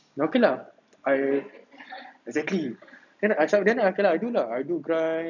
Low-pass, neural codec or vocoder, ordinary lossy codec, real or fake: 7.2 kHz; none; none; real